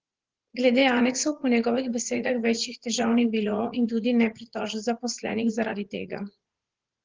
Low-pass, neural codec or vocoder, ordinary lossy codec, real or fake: 7.2 kHz; vocoder, 44.1 kHz, 80 mel bands, Vocos; Opus, 16 kbps; fake